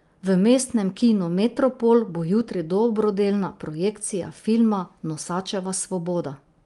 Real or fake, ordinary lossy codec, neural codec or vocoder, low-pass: real; Opus, 32 kbps; none; 10.8 kHz